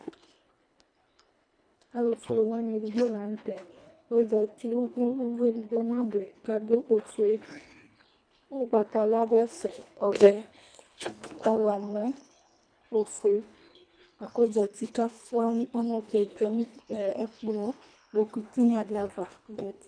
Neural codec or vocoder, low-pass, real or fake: codec, 24 kHz, 1.5 kbps, HILCodec; 9.9 kHz; fake